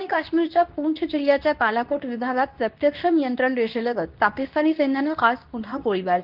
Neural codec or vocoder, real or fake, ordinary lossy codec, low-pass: codec, 24 kHz, 0.9 kbps, WavTokenizer, medium speech release version 2; fake; Opus, 32 kbps; 5.4 kHz